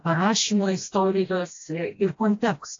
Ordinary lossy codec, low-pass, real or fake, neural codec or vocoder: AAC, 48 kbps; 7.2 kHz; fake; codec, 16 kHz, 1 kbps, FreqCodec, smaller model